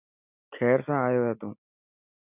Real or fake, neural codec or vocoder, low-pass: real; none; 3.6 kHz